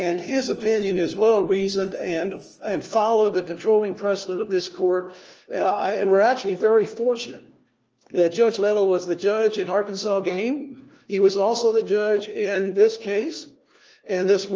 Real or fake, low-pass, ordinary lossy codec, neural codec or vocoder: fake; 7.2 kHz; Opus, 24 kbps; codec, 16 kHz, 1 kbps, FunCodec, trained on LibriTTS, 50 frames a second